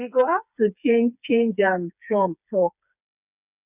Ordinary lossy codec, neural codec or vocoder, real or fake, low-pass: none; codec, 16 kHz, 4 kbps, FreqCodec, smaller model; fake; 3.6 kHz